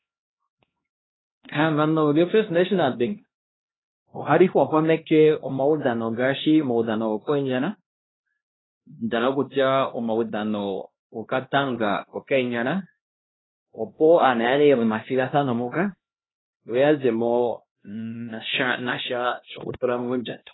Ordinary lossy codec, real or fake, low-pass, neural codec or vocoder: AAC, 16 kbps; fake; 7.2 kHz; codec, 16 kHz, 1 kbps, X-Codec, HuBERT features, trained on LibriSpeech